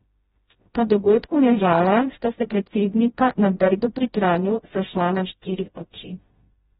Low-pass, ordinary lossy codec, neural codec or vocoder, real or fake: 7.2 kHz; AAC, 16 kbps; codec, 16 kHz, 0.5 kbps, FreqCodec, smaller model; fake